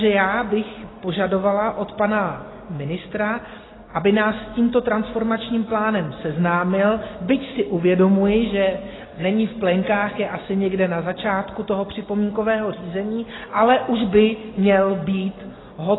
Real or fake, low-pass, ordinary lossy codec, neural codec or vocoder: real; 7.2 kHz; AAC, 16 kbps; none